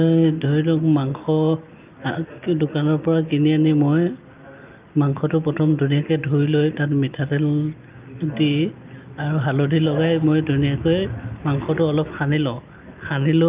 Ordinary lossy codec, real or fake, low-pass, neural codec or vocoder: Opus, 24 kbps; real; 3.6 kHz; none